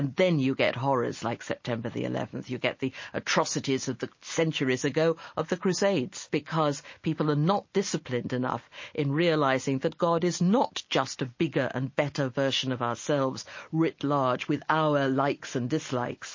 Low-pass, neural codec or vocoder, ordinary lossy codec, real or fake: 7.2 kHz; none; MP3, 32 kbps; real